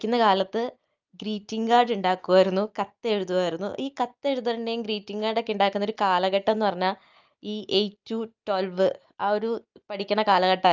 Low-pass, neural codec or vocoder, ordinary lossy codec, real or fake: 7.2 kHz; none; Opus, 24 kbps; real